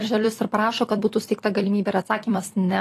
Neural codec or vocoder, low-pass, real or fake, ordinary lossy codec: vocoder, 44.1 kHz, 128 mel bands every 256 samples, BigVGAN v2; 14.4 kHz; fake; AAC, 48 kbps